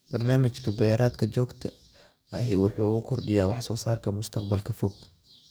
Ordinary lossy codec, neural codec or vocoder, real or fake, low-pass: none; codec, 44.1 kHz, 2.6 kbps, DAC; fake; none